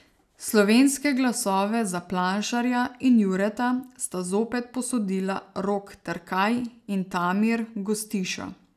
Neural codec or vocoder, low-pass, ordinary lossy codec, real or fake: none; 14.4 kHz; none; real